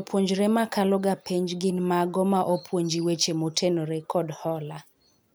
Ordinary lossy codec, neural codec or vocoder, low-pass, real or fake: none; none; none; real